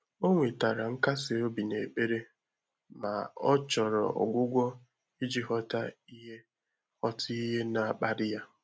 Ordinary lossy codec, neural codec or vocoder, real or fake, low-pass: none; none; real; none